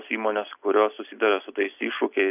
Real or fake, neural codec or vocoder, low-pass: real; none; 3.6 kHz